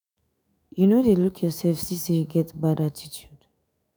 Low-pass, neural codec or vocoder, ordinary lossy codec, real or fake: none; autoencoder, 48 kHz, 128 numbers a frame, DAC-VAE, trained on Japanese speech; none; fake